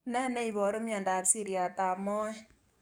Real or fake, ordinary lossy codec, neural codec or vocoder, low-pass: fake; none; codec, 44.1 kHz, 7.8 kbps, DAC; none